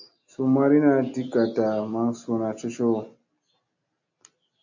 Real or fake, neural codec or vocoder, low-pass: real; none; 7.2 kHz